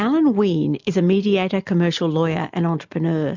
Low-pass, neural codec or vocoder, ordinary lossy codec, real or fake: 7.2 kHz; none; MP3, 64 kbps; real